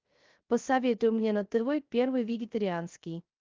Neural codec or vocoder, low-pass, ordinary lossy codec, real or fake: codec, 16 kHz, 0.3 kbps, FocalCodec; 7.2 kHz; Opus, 32 kbps; fake